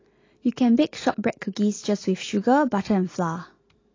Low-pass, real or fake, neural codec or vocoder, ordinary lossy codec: 7.2 kHz; real; none; AAC, 32 kbps